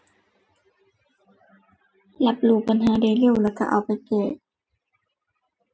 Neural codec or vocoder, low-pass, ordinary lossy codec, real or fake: none; none; none; real